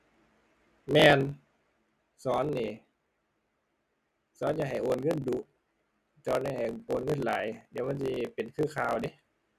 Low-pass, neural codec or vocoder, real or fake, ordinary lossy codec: 14.4 kHz; none; real; none